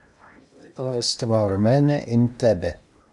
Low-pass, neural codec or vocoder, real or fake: 10.8 kHz; codec, 16 kHz in and 24 kHz out, 0.8 kbps, FocalCodec, streaming, 65536 codes; fake